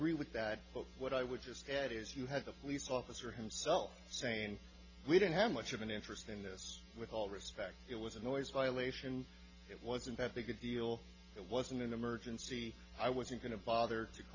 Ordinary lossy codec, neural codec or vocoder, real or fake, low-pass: AAC, 48 kbps; none; real; 7.2 kHz